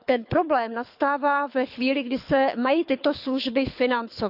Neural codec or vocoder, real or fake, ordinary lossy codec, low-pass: codec, 24 kHz, 6 kbps, HILCodec; fake; none; 5.4 kHz